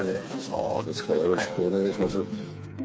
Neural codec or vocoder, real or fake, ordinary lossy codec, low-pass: codec, 16 kHz, 4 kbps, FreqCodec, smaller model; fake; none; none